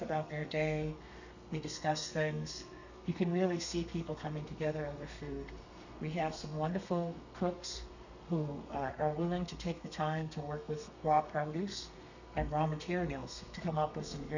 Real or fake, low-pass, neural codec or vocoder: fake; 7.2 kHz; codec, 32 kHz, 1.9 kbps, SNAC